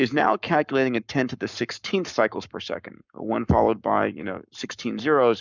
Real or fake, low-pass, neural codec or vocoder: fake; 7.2 kHz; codec, 44.1 kHz, 7.8 kbps, Pupu-Codec